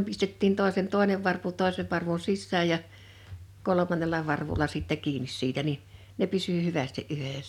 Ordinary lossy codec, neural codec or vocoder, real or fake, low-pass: none; none; real; 19.8 kHz